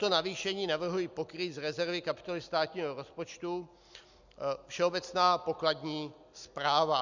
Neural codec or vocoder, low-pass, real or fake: none; 7.2 kHz; real